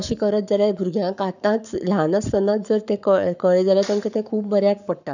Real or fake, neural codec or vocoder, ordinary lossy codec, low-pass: fake; codec, 44.1 kHz, 7.8 kbps, Pupu-Codec; none; 7.2 kHz